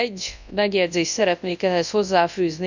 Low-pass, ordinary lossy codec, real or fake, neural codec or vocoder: 7.2 kHz; none; fake; codec, 24 kHz, 0.9 kbps, WavTokenizer, large speech release